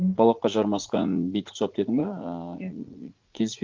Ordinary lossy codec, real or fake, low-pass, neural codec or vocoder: Opus, 24 kbps; fake; 7.2 kHz; vocoder, 44.1 kHz, 80 mel bands, Vocos